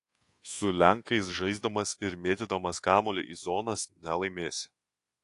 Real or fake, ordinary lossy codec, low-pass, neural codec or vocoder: fake; AAC, 48 kbps; 10.8 kHz; codec, 24 kHz, 1.2 kbps, DualCodec